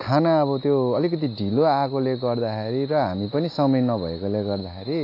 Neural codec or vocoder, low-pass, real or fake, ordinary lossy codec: none; 5.4 kHz; real; AAC, 32 kbps